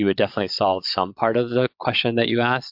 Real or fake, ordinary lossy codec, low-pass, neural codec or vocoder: fake; MP3, 48 kbps; 5.4 kHz; vocoder, 22.05 kHz, 80 mel bands, Vocos